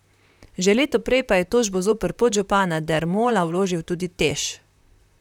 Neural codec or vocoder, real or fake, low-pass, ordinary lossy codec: vocoder, 44.1 kHz, 128 mel bands, Pupu-Vocoder; fake; 19.8 kHz; none